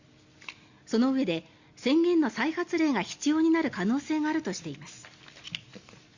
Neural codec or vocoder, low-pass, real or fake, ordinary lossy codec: none; 7.2 kHz; real; Opus, 64 kbps